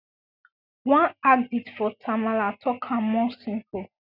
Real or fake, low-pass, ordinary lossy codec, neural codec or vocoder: real; 5.4 kHz; none; none